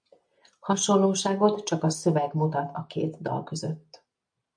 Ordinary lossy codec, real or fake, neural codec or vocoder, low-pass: MP3, 64 kbps; real; none; 9.9 kHz